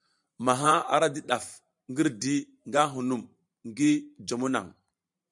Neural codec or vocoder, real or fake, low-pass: vocoder, 44.1 kHz, 128 mel bands every 512 samples, BigVGAN v2; fake; 10.8 kHz